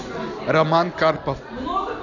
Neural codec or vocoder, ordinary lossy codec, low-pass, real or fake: none; none; 7.2 kHz; real